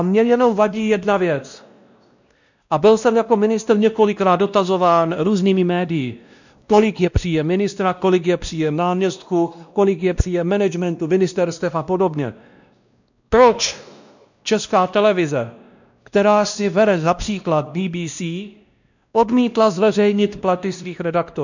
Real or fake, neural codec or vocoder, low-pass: fake; codec, 16 kHz, 1 kbps, X-Codec, WavLM features, trained on Multilingual LibriSpeech; 7.2 kHz